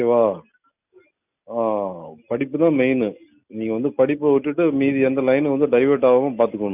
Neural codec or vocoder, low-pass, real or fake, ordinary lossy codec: none; 3.6 kHz; real; none